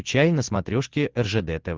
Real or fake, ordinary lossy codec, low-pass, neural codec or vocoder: real; Opus, 16 kbps; 7.2 kHz; none